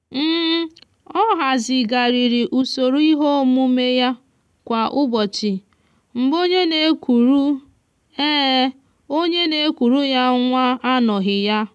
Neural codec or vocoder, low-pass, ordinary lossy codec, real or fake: none; none; none; real